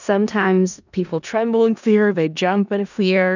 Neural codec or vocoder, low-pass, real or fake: codec, 16 kHz in and 24 kHz out, 0.4 kbps, LongCat-Audio-Codec, four codebook decoder; 7.2 kHz; fake